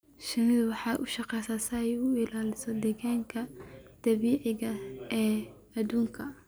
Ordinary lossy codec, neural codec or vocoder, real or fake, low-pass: none; none; real; none